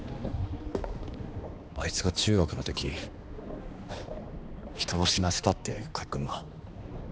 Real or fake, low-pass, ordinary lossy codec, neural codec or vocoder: fake; none; none; codec, 16 kHz, 2 kbps, X-Codec, HuBERT features, trained on balanced general audio